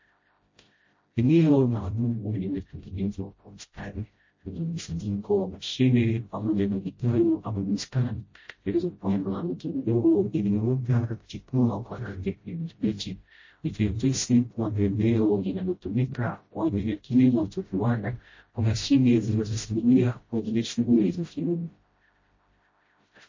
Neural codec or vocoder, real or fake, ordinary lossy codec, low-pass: codec, 16 kHz, 0.5 kbps, FreqCodec, smaller model; fake; MP3, 32 kbps; 7.2 kHz